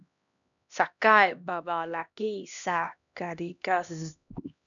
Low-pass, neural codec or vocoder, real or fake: 7.2 kHz; codec, 16 kHz, 1 kbps, X-Codec, HuBERT features, trained on LibriSpeech; fake